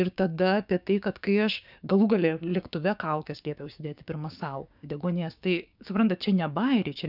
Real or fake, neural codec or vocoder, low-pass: fake; codec, 16 kHz, 6 kbps, DAC; 5.4 kHz